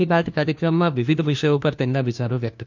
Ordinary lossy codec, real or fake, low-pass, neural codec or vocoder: MP3, 64 kbps; fake; 7.2 kHz; codec, 16 kHz, 1 kbps, FunCodec, trained on LibriTTS, 50 frames a second